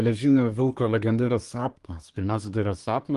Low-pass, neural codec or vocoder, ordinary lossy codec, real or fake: 10.8 kHz; codec, 24 kHz, 1 kbps, SNAC; Opus, 24 kbps; fake